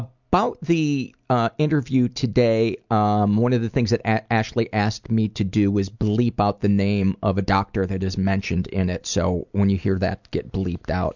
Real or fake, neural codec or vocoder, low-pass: real; none; 7.2 kHz